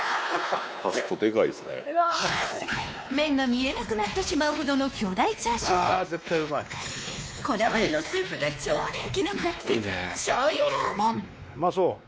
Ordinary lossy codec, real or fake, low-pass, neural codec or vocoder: none; fake; none; codec, 16 kHz, 2 kbps, X-Codec, WavLM features, trained on Multilingual LibriSpeech